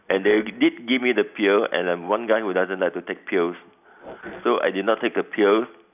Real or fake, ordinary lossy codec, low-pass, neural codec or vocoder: real; none; 3.6 kHz; none